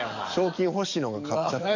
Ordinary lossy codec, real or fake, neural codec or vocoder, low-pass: none; fake; codec, 44.1 kHz, 7.8 kbps, DAC; 7.2 kHz